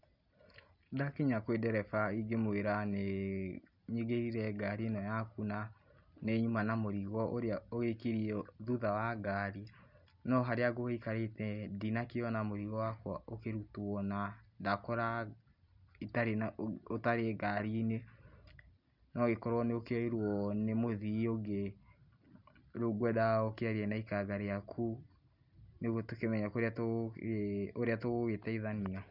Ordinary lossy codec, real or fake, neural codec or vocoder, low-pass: none; real; none; 5.4 kHz